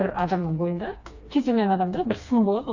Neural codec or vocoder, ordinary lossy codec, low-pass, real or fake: codec, 16 kHz, 2 kbps, FreqCodec, smaller model; Opus, 64 kbps; 7.2 kHz; fake